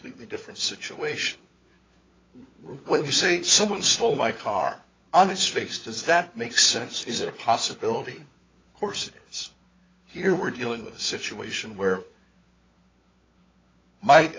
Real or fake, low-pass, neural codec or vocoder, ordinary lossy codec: fake; 7.2 kHz; codec, 16 kHz, 4 kbps, FunCodec, trained on LibriTTS, 50 frames a second; AAC, 32 kbps